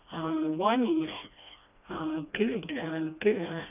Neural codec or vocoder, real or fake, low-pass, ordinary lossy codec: codec, 16 kHz, 1 kbps, FreqCodec, smaller model; fake; 3.6 kHz; none